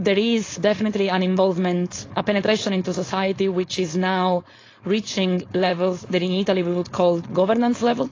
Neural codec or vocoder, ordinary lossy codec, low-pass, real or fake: codec, 16 kHz, 4.8 kbps, FACodec; AAC, 32 kbps; 7.2 kHz; fake